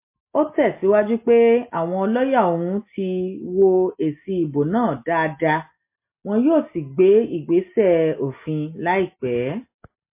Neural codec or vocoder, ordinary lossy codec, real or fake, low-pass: none; MP3, 24 kbps; real; 3.6 kHz